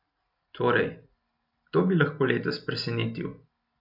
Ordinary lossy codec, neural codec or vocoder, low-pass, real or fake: AAC, 48 kbps; none; 5.4 kHz; real